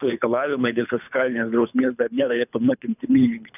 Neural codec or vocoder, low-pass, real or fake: codec, 24 kHz, 3 kbps, HILCodec; 3.6 kHz; fake